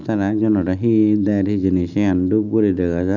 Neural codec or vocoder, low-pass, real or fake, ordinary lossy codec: none; 7.2 kHz; real; none